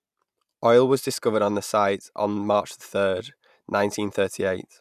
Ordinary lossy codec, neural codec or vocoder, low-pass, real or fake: none; none; 14.4 kHz; real